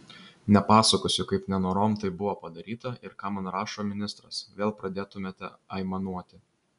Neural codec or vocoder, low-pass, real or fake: none; 10.8 kHz; real